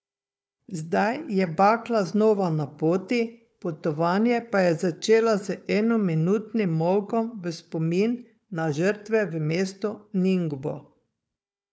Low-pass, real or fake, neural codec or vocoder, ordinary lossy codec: none; fake; codec, 16 kHz, 4 kbps, FunCodec, trained on Chinese and English, 50 frames a second; none